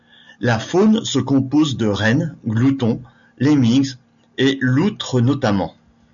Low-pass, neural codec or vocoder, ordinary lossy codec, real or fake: 7.2 kHz; none; MP3, 96 kbps; real